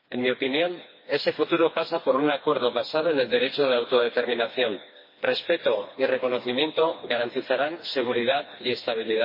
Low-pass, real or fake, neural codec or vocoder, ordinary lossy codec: 5.4 kHz; fake; codec, 16 kHz, 2 kbps, FreqCodec, smaller model; MP3, 24 kbps